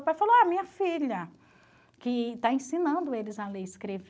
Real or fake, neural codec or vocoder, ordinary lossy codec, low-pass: real; none; none; none